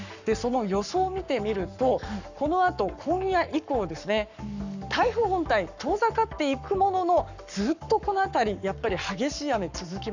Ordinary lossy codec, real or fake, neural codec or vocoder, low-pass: none; fake; codec, 44.1 kHz, 7.8 kbps, Pupu-Codec; 7.2 kHz